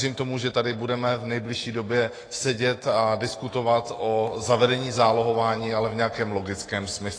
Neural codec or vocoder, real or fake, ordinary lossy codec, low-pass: autoencoder, 48 kHz, 128 numbers a frame, DAC-VAE, trained on Japanese speech; fake; AAC, 32 kbps; 9.9 kHz